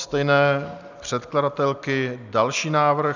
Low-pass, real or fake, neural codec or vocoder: 7.2 kHz; real; none